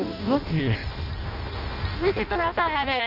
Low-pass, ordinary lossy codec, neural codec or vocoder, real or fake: 5.4 kHz; none; codec, 16 kHz in and 24 kHz out, 0.6 kbps, FireRedTTS-2 codec; fake